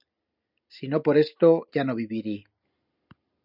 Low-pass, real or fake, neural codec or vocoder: 5.4 kHz; real; none